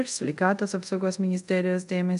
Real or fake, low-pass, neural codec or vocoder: fake; 10.8 kHz; codec, 24 kHz, 0.5 kbps, DualCodec